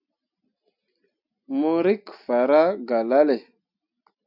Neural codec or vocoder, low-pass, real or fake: none; 5.4 kHz; real